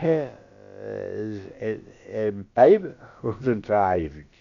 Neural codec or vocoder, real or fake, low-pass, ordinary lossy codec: codec, 16 kHz, about 1 kbps, DyCAST, with the encoder's durations; fake; 7.2 kHz; Opus, 64 kbps